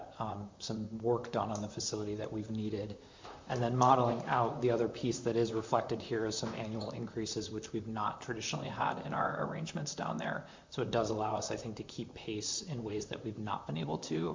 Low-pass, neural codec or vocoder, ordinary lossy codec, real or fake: 7.2 kHz; vocoder, 44.1 kHz, 128 mel bands, Pupu-Vocoder; MP3, 64 kbps; fake